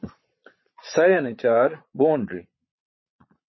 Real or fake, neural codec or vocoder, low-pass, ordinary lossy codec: fake; vocoder, 22.05 kHz, 80 mel bands, Vocos; 7.2 kHz; MP3, 24 kbps